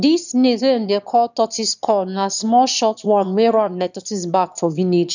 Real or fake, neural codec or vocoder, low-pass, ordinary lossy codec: fake; autoencoder, 22.05 kHz, a latent of 192 numbers a frame, VITS, trained on one speaker; 7.2 kHz; none